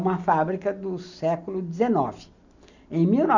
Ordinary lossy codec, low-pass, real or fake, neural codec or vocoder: none; 7.2 kHz; real; none